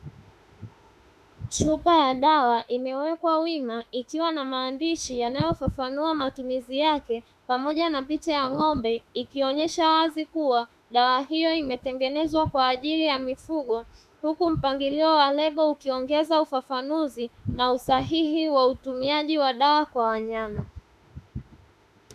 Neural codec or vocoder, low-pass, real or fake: autoencoder, 48 kHz, 32 numbers a frame, DAC-VAE, trained on Japanese speech; 14.4 kHz; fake